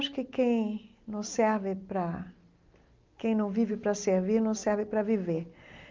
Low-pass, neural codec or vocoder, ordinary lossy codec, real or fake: 7.2 kHz; none; Opus, 24 kbps; real